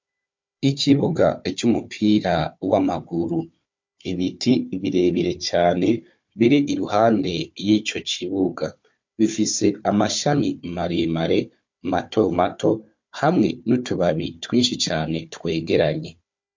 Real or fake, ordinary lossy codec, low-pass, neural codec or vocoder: fake; MP3, 48 kbps; 7.2 kHz; codec, 16 kHz, 4 kbps, FunCodec, trained on Chinese and English, 50 frames a second